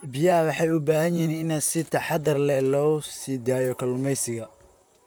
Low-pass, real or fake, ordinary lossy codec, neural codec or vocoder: none; fake; none; vocoder, 44.1 kHz, 128 mel bands, Pupu-Vocoder